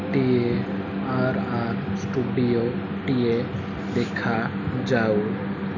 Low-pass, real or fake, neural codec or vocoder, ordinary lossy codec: 7.2 kHz; real; none; none